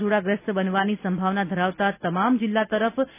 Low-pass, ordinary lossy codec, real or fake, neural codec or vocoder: 3.6 kHz; none; real; none